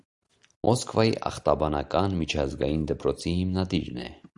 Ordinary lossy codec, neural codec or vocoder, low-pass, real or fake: Opus, 64 kbps; none; 10.8 kHz; real